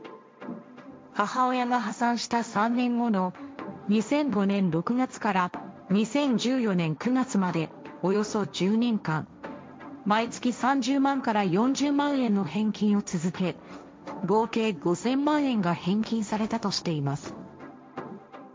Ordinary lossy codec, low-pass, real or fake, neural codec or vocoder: none; none; fake; codec, 16 kHz, 1.1 kbps, Voila-Tokenizer